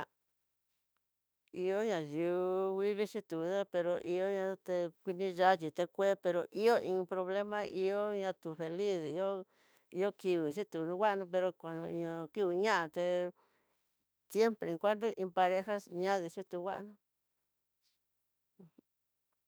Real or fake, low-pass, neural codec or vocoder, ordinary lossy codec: fake; none; autoencoder, 48 kHz, 32 numbers a frame, DAC-VAE, trained on Japanese speech; none